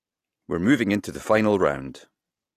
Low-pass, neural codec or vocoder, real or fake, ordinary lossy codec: 14.4 kHz; none; real; AAC, 48 kbps